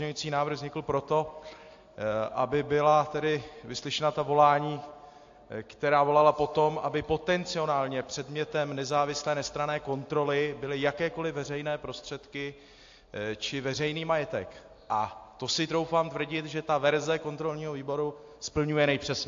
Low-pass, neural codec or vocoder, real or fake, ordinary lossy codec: 7.2 kHz; none; real; AAC, 48 kbps